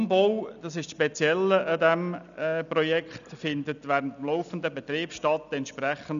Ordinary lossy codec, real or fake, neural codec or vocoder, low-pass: none; real; none; 7.2 kHz